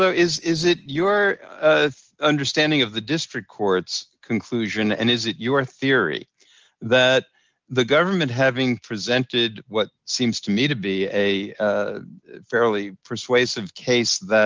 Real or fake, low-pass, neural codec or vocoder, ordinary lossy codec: real; 7.2 kHz; none; Opus, 24 kbps